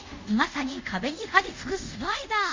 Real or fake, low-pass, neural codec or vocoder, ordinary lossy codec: fake; 7.2 kHz; codec, 24 kHz, 0.5 kbps, DualCodec; MP3, 48 kbps